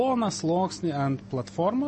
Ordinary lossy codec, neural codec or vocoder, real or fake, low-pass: MP3, 32 kbps; none; real; 10.8 kHz